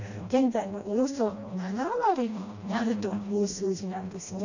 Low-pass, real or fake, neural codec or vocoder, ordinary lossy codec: 7.2 kHz; fake; codec, 16 kHz, 1 kbps, FreqCodec, smaller model; none